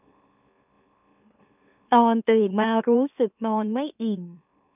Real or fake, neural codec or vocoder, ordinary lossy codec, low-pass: fake; autoencoder, 44.1 kHz, a latent of 192 numbers a frame, MeloTTS; none; 3.6 kHz